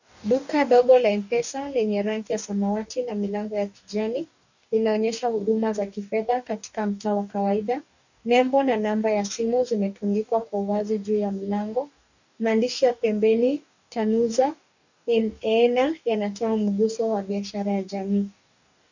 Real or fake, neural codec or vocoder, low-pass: fake; codec, 44.1 kHz, 2.6 kbps, DAC; 7.2 kHz